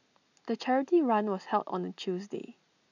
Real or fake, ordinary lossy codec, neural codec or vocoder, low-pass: real; none; none; 7.2 kHz